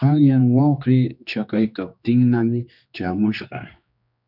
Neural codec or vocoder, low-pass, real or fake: codec, 16 kHz, 2 kbps, X-Codec, HuBERT features, trained on general audio; 5.4 kHz; fake